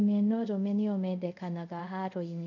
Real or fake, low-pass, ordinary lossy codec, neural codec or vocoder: fake; 7.2 kHz; none; codec, 24 kHz, 0.5 kbps, DualCodec